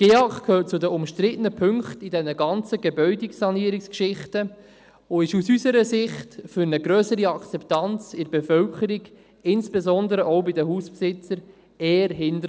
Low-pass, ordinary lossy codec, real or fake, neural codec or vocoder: none; none; real; none